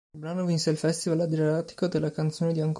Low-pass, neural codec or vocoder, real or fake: 10.8 kHz; none; real